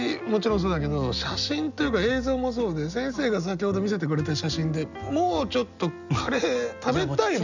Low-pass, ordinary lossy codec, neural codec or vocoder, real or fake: 7.2 kHz; none; none; real